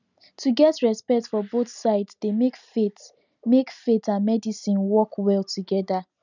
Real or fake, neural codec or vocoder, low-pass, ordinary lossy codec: real; none; 7.2 kHz; none